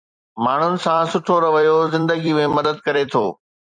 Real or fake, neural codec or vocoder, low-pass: fake; vocoder, 44.1 kHz, 128 mel bands every 256 samples, BigVGAN v2; 9.9 kHz